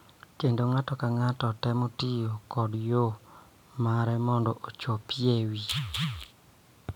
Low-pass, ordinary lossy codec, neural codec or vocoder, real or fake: 19.8 kHz; none; none; real